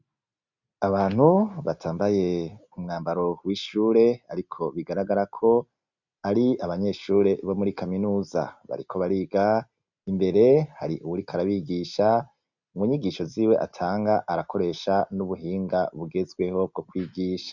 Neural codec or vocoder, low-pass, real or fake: none; 7.2 kHz; real